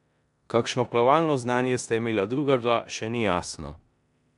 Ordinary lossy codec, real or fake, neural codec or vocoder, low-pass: none; fake; codec, 16 kHz in and 24 kHz out, 0.9 kbps, LongCat-Audio-Codec, four codebook decoder; 10.8 kHz